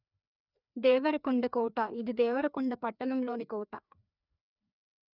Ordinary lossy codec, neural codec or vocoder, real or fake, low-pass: none; codec, 16 kHz, 2 kbps, FreqCodec, larger model; fake; 5.4 kHz